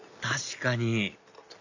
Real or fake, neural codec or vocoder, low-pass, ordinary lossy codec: real; none; 7.2 kHz; none